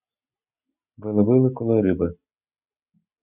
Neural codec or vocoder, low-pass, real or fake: none; 3.6 kHz; real